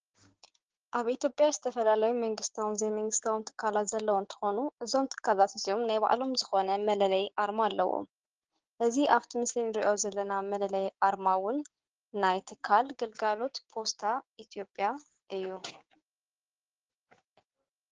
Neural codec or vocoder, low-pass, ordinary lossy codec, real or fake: codec, 16 kHz, 6 kbps, DAC; 7.2 kHz; Opus, 16 kbps; fake